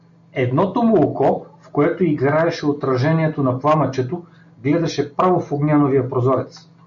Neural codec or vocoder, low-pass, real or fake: none; 7.2 kHz; real